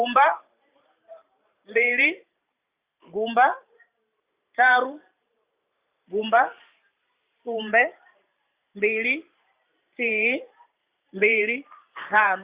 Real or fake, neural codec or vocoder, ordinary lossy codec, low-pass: fake; vocoder, 44.1 kHz, 128 mel bands every 512 samples, BigVGAN v2; Opus, 64 kbps; 3.6 kHz